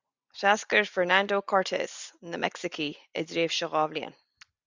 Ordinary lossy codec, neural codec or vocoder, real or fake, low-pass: Opus, 64 kbps; none; real; 7.2 kHz